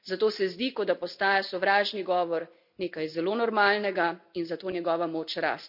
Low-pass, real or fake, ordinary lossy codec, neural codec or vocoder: 5.4 kHz; real; none; none